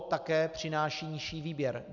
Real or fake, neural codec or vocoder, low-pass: real; none; 7.2 kHz